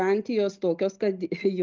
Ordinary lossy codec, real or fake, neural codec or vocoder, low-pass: Opus, 24 kbps; real; none; 7.2 kHz